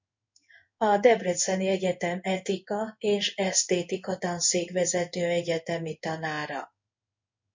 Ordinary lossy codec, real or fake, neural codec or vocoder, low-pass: MP3, 64 kbps; fake; codec, 16 kHz in and 24 kHz out, 1 kbps, XY-Tokenizer; 7.2 kHz